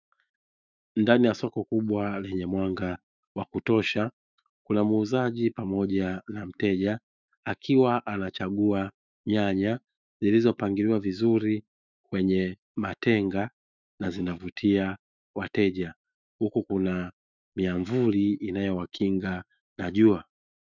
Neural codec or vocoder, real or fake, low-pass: autoencoder, 48 kHz, 128 numbers a frame, DAC-VAE, trained on Japanese speech; fake; 7.2 kHz